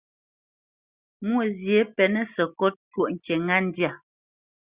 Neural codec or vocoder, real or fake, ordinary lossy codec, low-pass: none; real; Opus, 64 kbps; 3.6 kHz